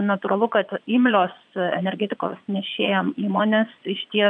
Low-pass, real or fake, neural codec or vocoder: 9.9 kHz; fake; vocoder, 22.05 kHz, 80 mel bands, Vocos